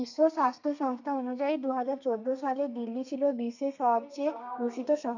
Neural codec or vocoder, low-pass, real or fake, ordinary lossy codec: codec, 32 kHz, 1.9 kbps, SNAC; 7.2 kHz; fake; none